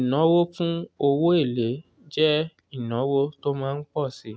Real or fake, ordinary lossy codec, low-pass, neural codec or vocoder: real; none; none; none